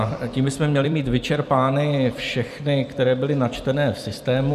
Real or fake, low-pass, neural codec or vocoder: fake; 14.4 kHz; vocoder, 44.1 kHz, 128 mel bands every 256 samples, BigVGAN v2